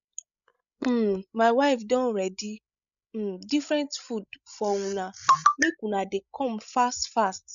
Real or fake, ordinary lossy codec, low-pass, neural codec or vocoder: fake; none; 7.2 kHz; codec, 16 kHz, 16 kbps, FreqCodec, larger model